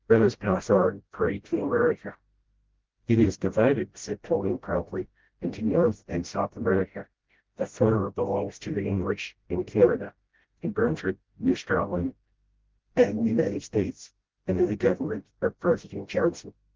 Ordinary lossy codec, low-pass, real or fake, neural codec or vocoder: Opus, 16 kbps; 7.2 kHz; fake; codec, 16 kHz, 0.5 kbps, FreqCodec, smaller model